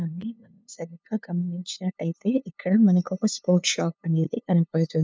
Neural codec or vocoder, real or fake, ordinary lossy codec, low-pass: codec, 16 kHz, 2 kbps, FunCodec, trained on LibriTTS, 25 frames a second; fake; none; none